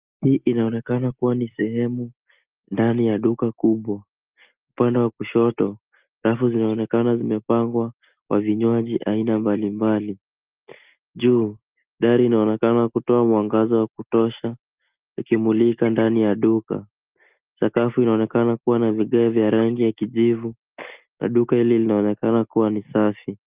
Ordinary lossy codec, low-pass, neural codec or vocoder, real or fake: Opus, 32 kbps; 3.6 kHz; none; real